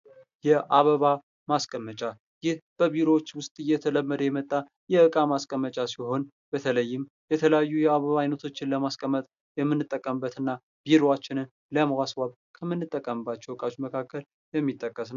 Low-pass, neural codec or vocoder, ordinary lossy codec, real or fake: 7.2 kHz; none; AAC, 96 kbps; real